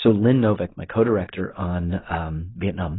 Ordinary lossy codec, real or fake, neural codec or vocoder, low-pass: AAC, 16 kbps; real; none; 7.2 kHz